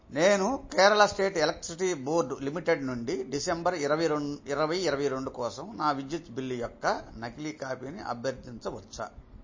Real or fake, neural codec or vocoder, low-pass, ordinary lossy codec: real; none; 7.2 kHz; MP3, 32 kbps